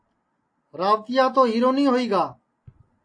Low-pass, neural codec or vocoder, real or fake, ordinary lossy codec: 9.9 kHz; none; real; AAC, 48 kbps